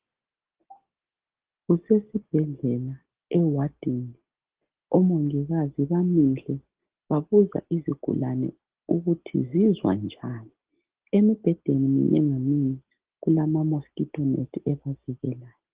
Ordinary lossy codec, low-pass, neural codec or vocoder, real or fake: Opus, 16 kbps; 3.6 kHz; none; real